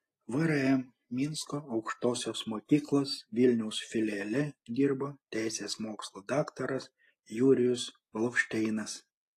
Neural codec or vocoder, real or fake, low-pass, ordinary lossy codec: none; real; 14.4 kHz; AAC, 48 kbps